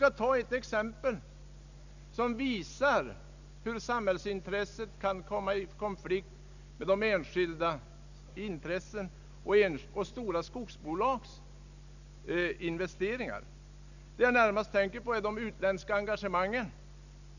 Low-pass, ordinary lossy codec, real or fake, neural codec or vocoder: 7.2 kHz; none; real; none